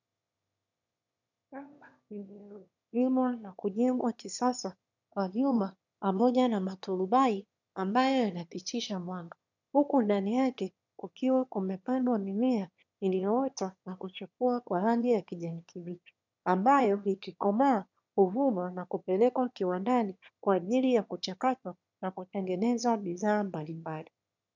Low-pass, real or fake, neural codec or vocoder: 7.2 kHz; fake; autoencoder, 22.05 kHz, a latent of 192 numbers a frame, VITS, trained on one speaker